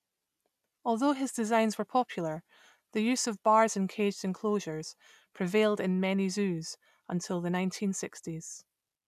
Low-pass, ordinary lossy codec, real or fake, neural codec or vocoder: 14.4 kHz; none; real; none